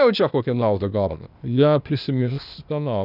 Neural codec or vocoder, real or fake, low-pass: codec, 16 kHz, 0.8 kbps, ZipCodec; fake; 5.4 kHz